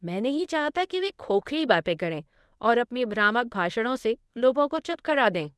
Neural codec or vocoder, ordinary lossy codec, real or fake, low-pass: codec, 24 kHz, 0.9 kbps, WavTokenizer, medium speech release version 1; none; fake; none